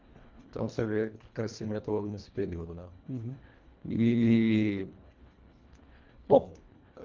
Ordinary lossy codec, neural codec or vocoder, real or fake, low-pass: Opus, 32 kbps; codec, 24 kHz, 1.5 kbps, HILCodec; fake; 7.2 kHz